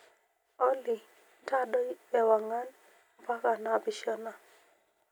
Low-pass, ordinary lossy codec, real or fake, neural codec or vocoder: none; none; real; none